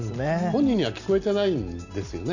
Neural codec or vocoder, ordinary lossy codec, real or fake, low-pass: none; none; real; 7.2 kHz